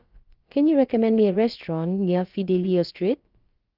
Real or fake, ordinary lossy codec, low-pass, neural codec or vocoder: fake; Opus, 24 kbps; 5.4 kHz; codec, 16 kHz, about 1 kbps, DyCAST, with the encoder's durations